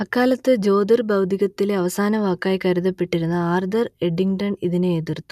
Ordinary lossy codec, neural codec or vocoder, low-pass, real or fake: none; none; 14.4 kHz; real